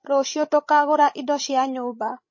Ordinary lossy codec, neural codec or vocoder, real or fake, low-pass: MP3, 32 kbps; codec, 16 kHz, 16 kbps, FreqCodec, larger model; fake; 7.2 kHz